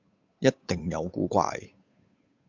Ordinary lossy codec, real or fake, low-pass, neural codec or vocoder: MP3, 64 kbps; fake; 7.2 kHz; codec, 16 kHz, 8 kbps, FunCodec, trained on Chinese and English, 25 frames a second